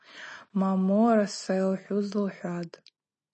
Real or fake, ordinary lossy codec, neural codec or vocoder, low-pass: real; MP3, 32 kbps; none; 10.8 kHz